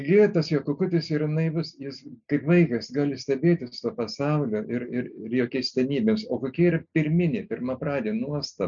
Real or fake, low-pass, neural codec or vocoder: real; 7.2 kHz; none